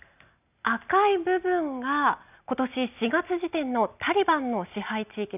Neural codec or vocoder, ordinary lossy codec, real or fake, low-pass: none; none; real; 3.6 kHz